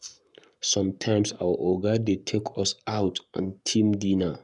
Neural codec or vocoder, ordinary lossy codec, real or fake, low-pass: codec, 44.1 kHz, 7.8 kbps, Pupu-Codec; none; fake; 10.8 kHz